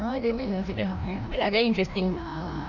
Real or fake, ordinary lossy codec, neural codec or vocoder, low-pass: fake; none; codec, 16 kHz, 1 kbps, FreqCodec, larger model; 7.2 kHz